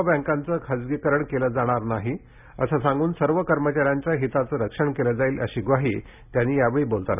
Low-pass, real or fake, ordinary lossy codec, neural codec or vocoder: 3.6 kHz; real; none; none